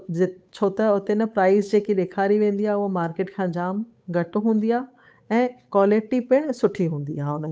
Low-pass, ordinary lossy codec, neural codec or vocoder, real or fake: none; none; codec, 16 kHz, 8 kbps, FunCodec, trained on Chinese and English, 25 frames a second; fake